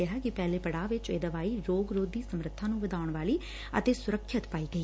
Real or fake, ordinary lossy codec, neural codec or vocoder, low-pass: real; none; none; none